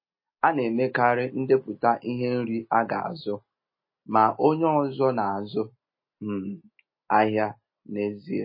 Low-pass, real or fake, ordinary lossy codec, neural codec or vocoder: 5.4 kHz; real; MP3, 24 kbps; none